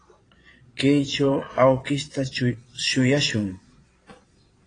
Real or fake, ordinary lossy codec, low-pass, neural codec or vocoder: fake; AAC, 32 kbps; 9.9 kHz; vocoder, 22.05 kHz, 80 mel bands, Vocos